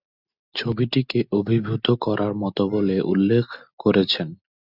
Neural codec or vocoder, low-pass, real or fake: none; 5.4 kHz; real